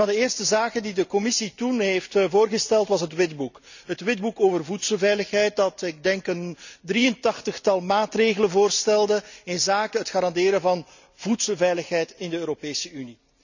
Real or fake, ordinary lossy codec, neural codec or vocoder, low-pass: real; none; none; 7.2 kHz